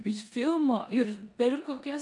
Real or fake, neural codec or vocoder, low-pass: fake; codec, 16 kHz in and 24 kHz out, 0.9 kbps, LongCat-Audio-Codec, four codebook decoder; 10.8 kHz